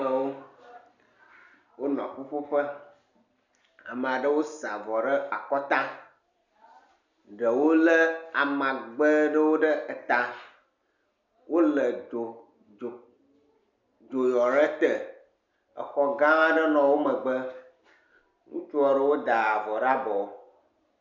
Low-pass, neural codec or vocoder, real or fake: 7.2 kHz; none; real